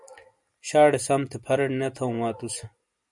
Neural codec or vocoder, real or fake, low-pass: none; real; 10.8 kHz